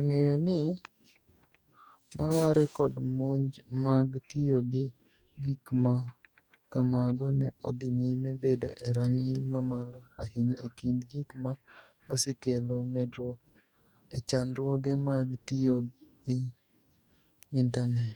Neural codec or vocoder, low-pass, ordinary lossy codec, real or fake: codec, 44.1 kHz, 2.6 kbps, DAC; 19.8 kHz; none; fake